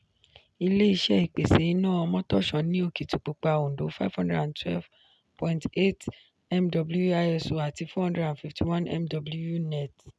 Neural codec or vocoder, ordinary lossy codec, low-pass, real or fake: none; none; none; real